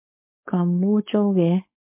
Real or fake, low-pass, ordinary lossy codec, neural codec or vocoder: fake; 3.6 kHz; MP3, 32 kbps; codec, 16 kHz, 4.8 kbps, FACodec